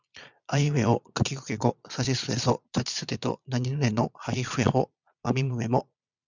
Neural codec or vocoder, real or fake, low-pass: vocoder, 22.05 kHz, 80 mel bands, Vocos; fake; 7.2 kHz